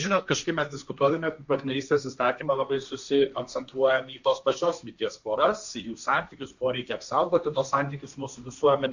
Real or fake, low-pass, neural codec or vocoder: fake; 7.2 kHz; codec, 16 kHz, 1.1 kbps, Voila-Tokenizer